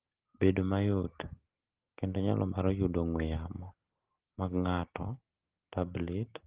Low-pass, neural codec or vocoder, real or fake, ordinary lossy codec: 3.6 kHz; none; real; Opus, 16 kbps